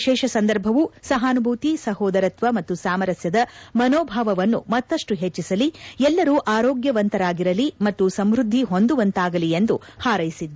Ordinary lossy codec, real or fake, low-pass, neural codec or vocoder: none; real; none; none